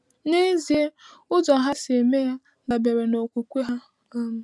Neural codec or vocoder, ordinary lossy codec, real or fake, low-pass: none; none; real; none